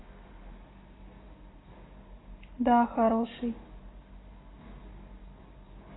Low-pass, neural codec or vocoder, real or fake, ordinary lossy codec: 7.2 kHz; none; real; AAC, 16 kbps